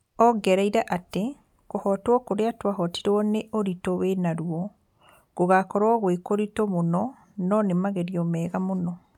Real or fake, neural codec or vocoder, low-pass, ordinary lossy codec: real; none; 19.8 kHz; none